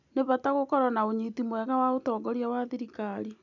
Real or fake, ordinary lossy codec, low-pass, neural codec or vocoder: real; none; 7.2 kHz; none